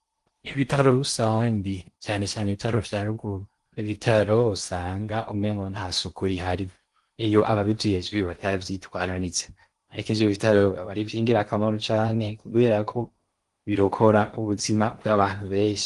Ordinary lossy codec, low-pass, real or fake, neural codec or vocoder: Opus, 24 kbps; 10.8 kHz; fake; codec, 16 kHz in and 24 kHz out, 0.8 kbps, FocalCodec, streaming, 65536 codes